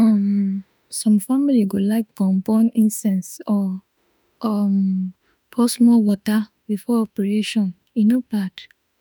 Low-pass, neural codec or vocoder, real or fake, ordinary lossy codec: none; autoencoder, 48 kHz, 32 numbers a frame, DAC-VAE, trained on Japanese speech; fake; none